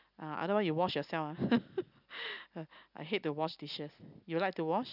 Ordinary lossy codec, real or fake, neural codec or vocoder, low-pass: none; real; none; 5.4 kHz